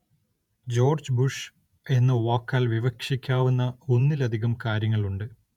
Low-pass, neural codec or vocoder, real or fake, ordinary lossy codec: 19.8 kHz; vocoder, 48 kHz, 128 mel bands, Vocos; fake; none